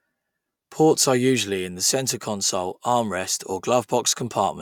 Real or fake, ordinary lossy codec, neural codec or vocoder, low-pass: real; none; none; 19.8 kHz